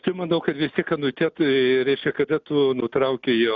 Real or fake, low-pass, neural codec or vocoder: real; 7.2 kHz; none